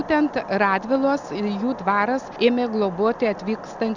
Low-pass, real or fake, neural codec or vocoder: 7.2 kHz; real; none